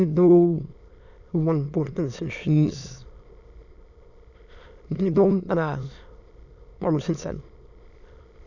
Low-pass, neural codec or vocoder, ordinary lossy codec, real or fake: 7.2 kHz; autoencoder, 22.05 kHz, a latent of 192 numbers a frame, VITS, trained on many speakers; none; fake